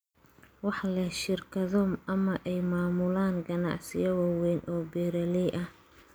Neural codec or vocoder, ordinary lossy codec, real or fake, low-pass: none; none; real; none